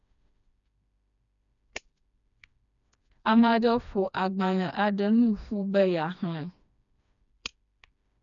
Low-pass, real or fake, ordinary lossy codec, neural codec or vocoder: 7.2 kHz; fake; none; codec, 16 kHz, 2 kbps, FreqCodec, smaller model